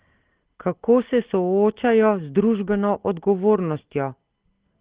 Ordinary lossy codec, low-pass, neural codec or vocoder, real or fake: Opus, 16 kbps; 3.6 kHz; none; real